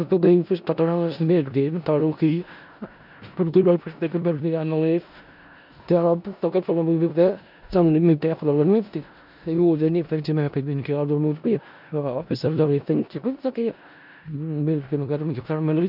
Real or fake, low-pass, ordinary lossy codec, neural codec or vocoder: fake; 5.4 kHz; none; codec, 16 kHz in and 24 kHz out, 0.4 kbps, LongCat-Audio-Codec, four codebook decoder